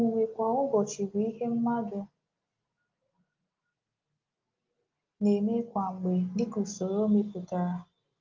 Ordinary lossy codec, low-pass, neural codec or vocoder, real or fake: Opus, 32 kbps; 7.2 kHz; none; real